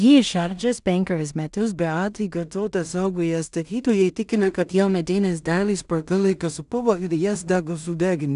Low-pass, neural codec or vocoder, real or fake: 10.8 kHz; codec, 16 kHz in and 24 kHz out, 0.4 kbps, LongCat-Audio-Codec, two codebook decoder; fake